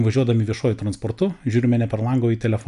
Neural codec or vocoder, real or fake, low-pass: none; real; 10.8 kHz